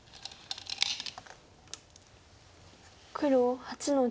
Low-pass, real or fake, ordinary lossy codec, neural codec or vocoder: none; real; none; none